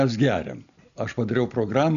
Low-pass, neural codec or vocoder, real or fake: 7.2 kHz; none; real